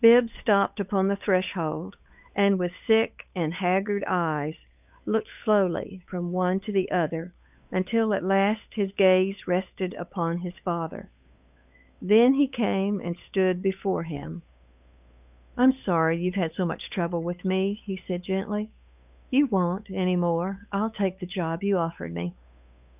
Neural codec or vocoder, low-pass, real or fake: codec, 16 kHz, 8 kbps, FunCodec, trained on Chinese and English, 25 frames a second; 3.6 kHz; fake